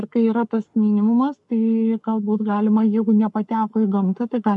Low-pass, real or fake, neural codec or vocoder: 10.8 kHz; fake; codec, 44.1 kHz, 7.8 kbps, Pupu-Codec